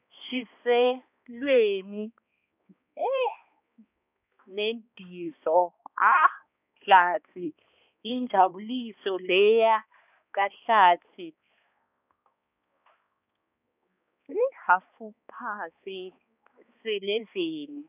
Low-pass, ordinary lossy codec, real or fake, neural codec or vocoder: 3.6 kHz; none; fake; codec, 16 kHz, 2 kbps, X-Codec, HuBERT features, trained on balanced general audio